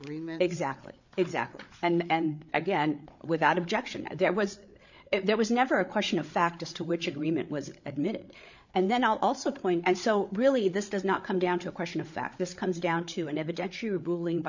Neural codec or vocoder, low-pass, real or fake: codec, 16 kHz, 8 kbps, FreqCodec, larger model; 7.2 kHz; fake